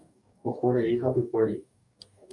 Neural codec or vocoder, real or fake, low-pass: codec, 44.1 kHz, 2.6 kbps, DAC; fake; 10.8 kHz